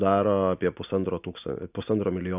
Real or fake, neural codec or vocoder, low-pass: real; none; 3.6 kHz